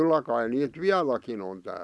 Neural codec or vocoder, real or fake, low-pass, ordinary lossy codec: none; real; none; none